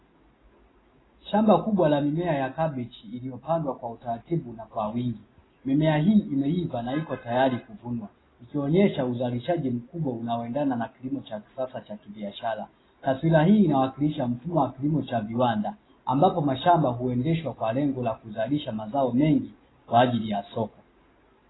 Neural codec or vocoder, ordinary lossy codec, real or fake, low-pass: none; AAC, 16 kbps; real; 7.2 kHz